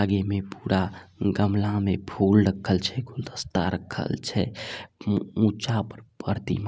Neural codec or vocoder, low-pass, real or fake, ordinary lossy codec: none; none; real; none